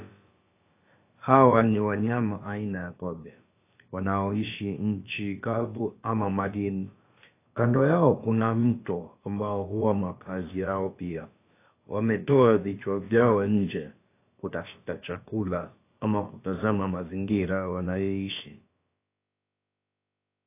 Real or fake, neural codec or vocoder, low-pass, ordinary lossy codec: fake; codec, 16 kHz, about 1 kbps, DyCAST, with the encoder's durations; 3.6 kHz; AAC, 24 kbps